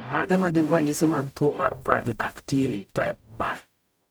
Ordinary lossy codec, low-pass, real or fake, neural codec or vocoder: none; none; fake; codec, 44.1 kHz, 0.9 kbps, DAC